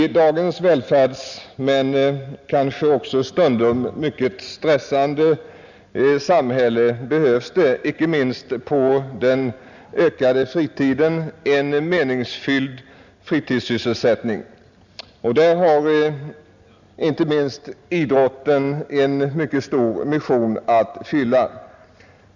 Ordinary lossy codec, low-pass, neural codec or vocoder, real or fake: none; 7.2 kHz; none; real